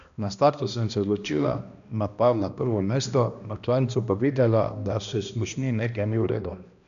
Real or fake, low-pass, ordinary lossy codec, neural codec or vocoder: fake; 7.2 kHz; none; codec, 16 kHz, 1 kbps, X-Codec, HuBERT features, trained on balanced general audio